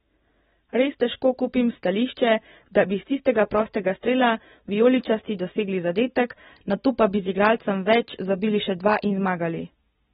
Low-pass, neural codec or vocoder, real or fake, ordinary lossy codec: 19.8 kHz; none; real; AAC, 16 kbps